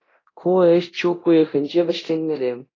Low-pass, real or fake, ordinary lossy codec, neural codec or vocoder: 7.2 kHz; fake; AAC, 32 kbps; codec, 24 kHz, 0.9 kbps, DualCodec